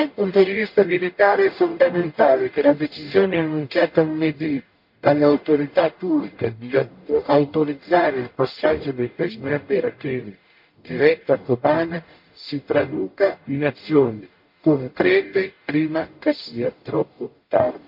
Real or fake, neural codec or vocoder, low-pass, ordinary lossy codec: fake; codec, 44.1 kHz, 0.9 kbps, DAC; 5.4 kHz; MP3, 32 kbps